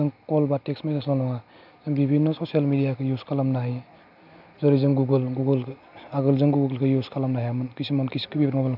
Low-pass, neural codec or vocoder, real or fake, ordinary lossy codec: 5.4 kHz; none; real; none